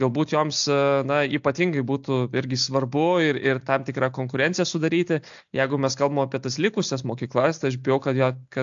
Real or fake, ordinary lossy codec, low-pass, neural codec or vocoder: real; AAC, 64 kbps; 7.2 kHz; none